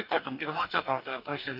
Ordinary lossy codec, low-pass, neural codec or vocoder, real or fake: none; 5.4 kHz; codec, 44.1 kHz, 2.6 kbps, DAC; fake